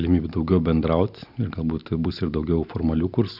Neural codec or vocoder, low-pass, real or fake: none; 5.4 kHz; real